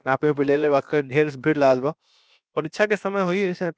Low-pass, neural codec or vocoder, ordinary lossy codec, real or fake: none; codec, 16 kHz, about 1 kbps, DyCAST, with the encoder's durations; none; fake